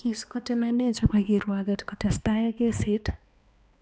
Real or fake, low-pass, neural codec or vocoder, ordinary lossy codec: fake; none; codec, 16 kHz, 2 kbps, X-Codec, HuBERT features, trained on balanced general audio; none